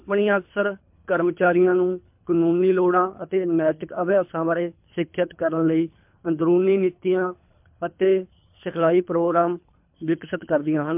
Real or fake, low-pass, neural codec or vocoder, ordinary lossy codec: fake; 3.6 kHz; codec, 24 kHz, 3 kbps, HILCodec; MP3, 32 kbps